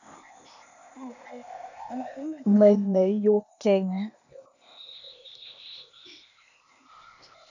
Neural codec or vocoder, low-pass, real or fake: codec, 16 kHz, 0.8 kbps, ZipCodec; 7.2 kHz; fake